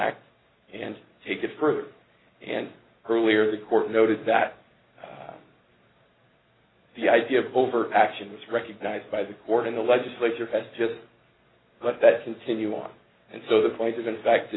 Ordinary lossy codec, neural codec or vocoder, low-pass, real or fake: AAC, 16 kbps; none; 7.2 kHz; real